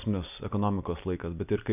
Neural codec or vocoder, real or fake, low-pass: none; real; 3.6 kHz